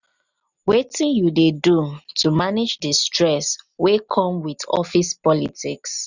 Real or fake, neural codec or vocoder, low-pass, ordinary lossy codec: real; none; 7.2 kHz; none